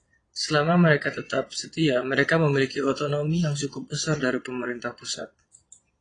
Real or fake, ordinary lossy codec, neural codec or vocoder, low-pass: fake; AAC, 32 kbps; vocoder, 22.05 kHz, 80 mel bands, Vocos; 9.9 kHz